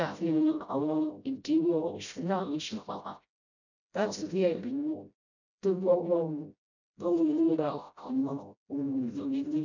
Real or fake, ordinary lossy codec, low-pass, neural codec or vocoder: fake; none; 7.2 kHz; codec, 16 kHz, 0.5 kbps, FreqCodec, smaller model